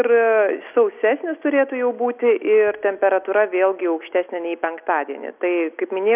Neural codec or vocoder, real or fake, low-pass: none; real; 3.6 kHz